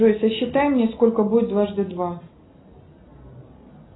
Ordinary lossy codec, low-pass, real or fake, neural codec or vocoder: AAC, 16 kbps; 7.2 kHz; real; none